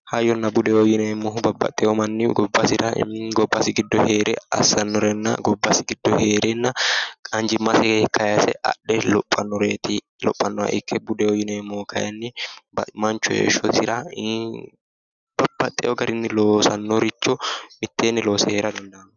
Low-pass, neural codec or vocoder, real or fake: 7.2 kHz; none; real